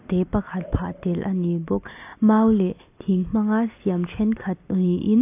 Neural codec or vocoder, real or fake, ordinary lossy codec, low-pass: none; real; AAC, 24 kbps; 3.6 kHz